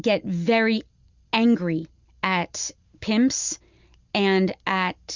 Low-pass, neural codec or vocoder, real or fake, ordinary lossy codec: 7.2 kHz; none; real; Opus, 64 kbps